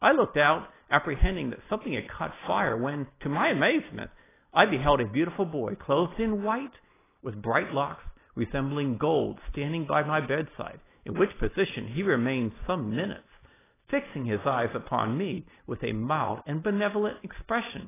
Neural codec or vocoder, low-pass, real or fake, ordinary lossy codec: none; 3.6 kHz; real; AAC, 16 kbps